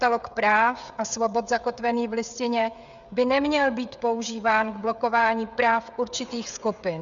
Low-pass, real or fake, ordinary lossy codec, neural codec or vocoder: 7.2 kHz; fake; Opus, 64 kbps; codec, 16 kHz, 16 kbps, FreqCodec, smaller model